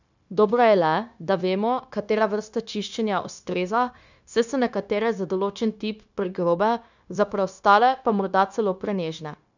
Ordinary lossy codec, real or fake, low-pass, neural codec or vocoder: none; fake; 7.2 kHz; codec, 16 kHz, 0.9 kbps, LongCat-Audio-Codec